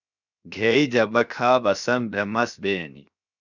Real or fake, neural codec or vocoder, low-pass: fake; codec, 16 kHz, 0.7 kbps, FocalCodec; 7.2 kHz